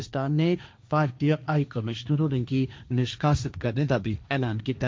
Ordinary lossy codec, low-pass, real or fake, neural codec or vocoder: none; none; fake; codec, 16 kHz, 1.1 kbps, Voila-Tokenizer